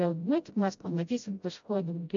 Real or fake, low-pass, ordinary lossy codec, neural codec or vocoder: fake; 7.2 kHz; AAC, 64 kbps; codec, 16 kHz, 0.5 kbps, FreqCodec, smaller model